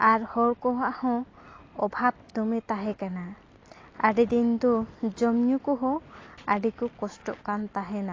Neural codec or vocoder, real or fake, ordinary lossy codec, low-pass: none; real; AAC, 32 kbps; 7.2 kHz